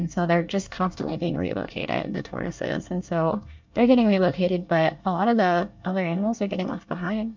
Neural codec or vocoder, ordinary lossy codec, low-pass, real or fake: codec, 24 kHz, 1 kbps, SNAC; MP3, 64 kbps; 7.2 kHz; fake